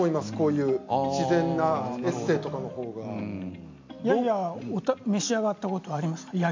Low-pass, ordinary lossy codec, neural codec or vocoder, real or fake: 7.2 kHz; MP3, 48 kbps; none; real